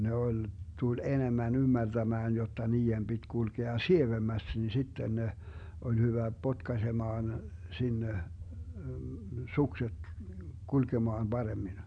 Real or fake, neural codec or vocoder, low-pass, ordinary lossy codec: real; none; 9.9 kHz; none